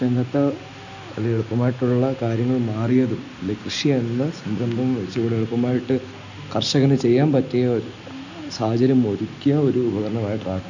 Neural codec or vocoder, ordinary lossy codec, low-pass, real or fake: none; none; 7.2 kHz; real